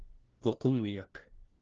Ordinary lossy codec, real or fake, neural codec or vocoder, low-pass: Opus, 16 kbps; fake; codec, 16 kHz, 1 kbps, FunCodec, trained on LibriTTS, 50 frames a second; 7.2 kHz